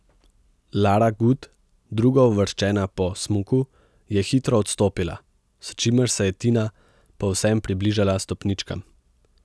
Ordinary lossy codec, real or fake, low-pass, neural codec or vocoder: none; real; none; none